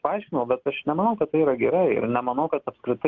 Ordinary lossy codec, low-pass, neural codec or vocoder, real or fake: Opus, 24 kbps; 7.2 kHz; none; real